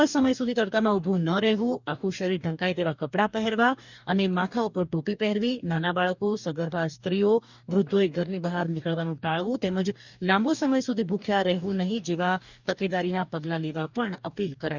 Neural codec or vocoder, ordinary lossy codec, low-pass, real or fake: codec, 44.1 kHz, 2.6 kbps, DAC; none; 7.2 kHz; fake